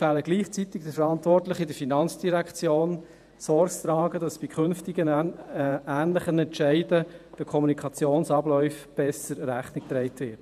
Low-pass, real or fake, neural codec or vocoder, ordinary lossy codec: 14.4 kHz; fake; vocoder, 48 kHz, 128 mel bands, Vocos; none